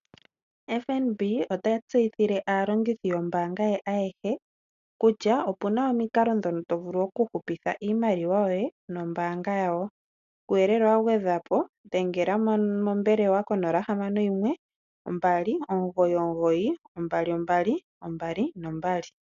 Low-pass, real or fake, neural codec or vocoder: 7.2 kHz; real; none